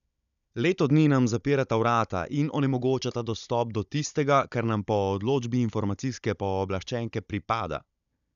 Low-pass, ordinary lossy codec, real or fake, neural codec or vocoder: 7.2 kHz; none; fake; codec, 16 kHz, 16 kbps, FunCodec, trained on Chinese and English, 50 frames a second